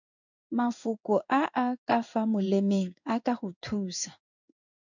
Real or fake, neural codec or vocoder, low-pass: fake; codec, 16 kHz in and 24 kHz out, 1 kbps, XY-Tokenizer; 7.2 kHz